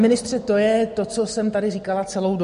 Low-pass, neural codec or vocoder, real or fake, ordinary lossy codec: 10.8 kHz; none; real; MP3, 48 kbps